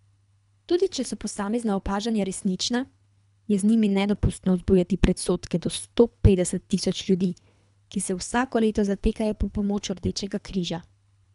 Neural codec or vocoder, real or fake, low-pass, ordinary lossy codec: codec, 24 kHz, 3 kbps, HILCodec; fake; 10.8 kHz; none